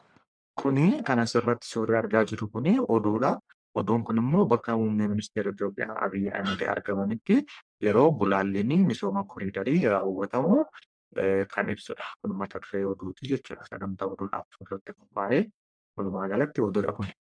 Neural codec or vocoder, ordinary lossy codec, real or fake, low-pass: codec, 44.1 kHz, 1.7 kbps, Pupu-Codec; MP3, 96 kbps; fake; 9.9 kHz